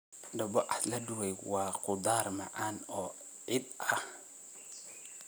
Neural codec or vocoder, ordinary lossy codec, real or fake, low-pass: none; none; real; none